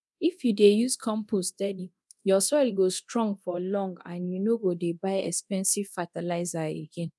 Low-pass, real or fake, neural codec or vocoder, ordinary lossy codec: none; fake; codec, 24 kHz, 0.9 kbps, DualCodec; none